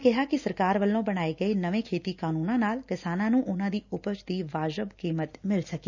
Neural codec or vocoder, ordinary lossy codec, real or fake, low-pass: none; none; real; 7.2 kHz